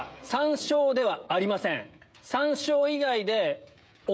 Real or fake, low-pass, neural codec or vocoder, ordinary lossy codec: fake; none; codec, 16 kHz, 16 kbps, FreqCodec, smaller model; none